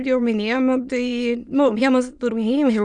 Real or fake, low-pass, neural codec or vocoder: fake; 9.9 kHz; autoencoder, 22.05 kHz, a latent of 192 numbers a frame, VITS, trained on many speakers